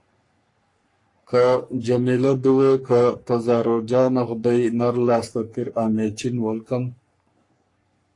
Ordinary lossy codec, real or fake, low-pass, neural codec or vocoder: AAC, 48 kbps; fake; 10.8 kHz; codec, 44.1 kHz, 3.4 kbps, Pupu-Codec